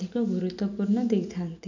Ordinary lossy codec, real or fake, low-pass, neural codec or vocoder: none; real; 7.2 kHz; none